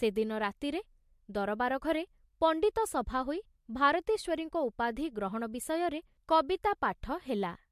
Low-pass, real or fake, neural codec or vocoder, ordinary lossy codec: 14.4 kHz; real; none; MP3, 64 kbps